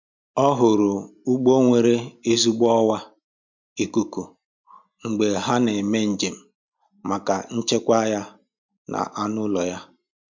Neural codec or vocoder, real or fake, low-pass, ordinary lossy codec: none; real; 7.2 kHz; MP3, 64 kbps